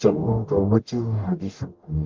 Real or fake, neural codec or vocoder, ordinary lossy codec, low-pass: fake; codec, 44.1 kHz, 0.9 kbps, DAC; Opus, 32 kbps; 7.2 kHz